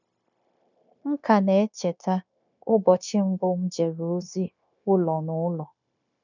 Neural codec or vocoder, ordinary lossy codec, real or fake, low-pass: codec, 16 kHz, 0.9 kbps, LongCat-Audio-Codec; none; fake; 7.2 kHz